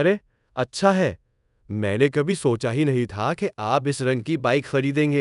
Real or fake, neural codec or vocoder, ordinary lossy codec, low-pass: fake; codec, 24 kHz, 0.5 kbps, DualCodec; none; 10.8 kHz